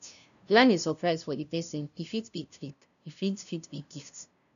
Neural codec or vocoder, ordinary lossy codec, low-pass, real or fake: codec, 16 kHz, 0.5 kbps, FunCodec, trained on LibriTTS, 25 frames a second; none; 7.2 kHz; fake